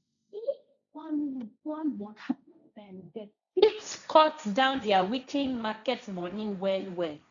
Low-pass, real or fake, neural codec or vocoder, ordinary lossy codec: 7.2 kHz; fake; codec, 16 kHz, 1.1 kbps, Voila-Tokenizer; none